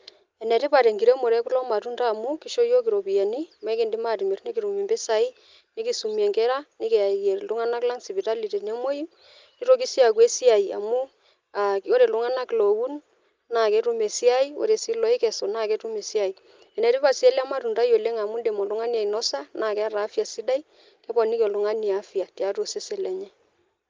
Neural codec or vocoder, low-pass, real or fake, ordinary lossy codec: none; 7.2 kHz; real; Opus, 24 kbps